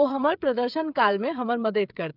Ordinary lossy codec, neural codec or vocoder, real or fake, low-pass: none; codec, 16 kHz, 8 kbps, FreqCodec, smaller model; fake; 5.4 kHz